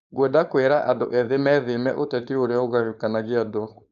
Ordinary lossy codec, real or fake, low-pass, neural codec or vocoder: none; fake; 7.2 kHz; codec, 16 kHz, 4.8 kbps, FACodec